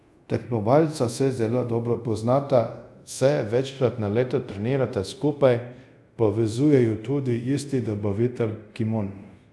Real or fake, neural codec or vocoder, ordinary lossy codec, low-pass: fake; codec, 24 kHz, 0.5 kbps, DualCodec; none; none